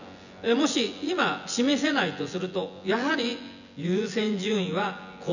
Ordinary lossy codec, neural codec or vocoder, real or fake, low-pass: none; vocoder, 24 kHz, 100 mel bands, Vocos; fake; 7.2 kHz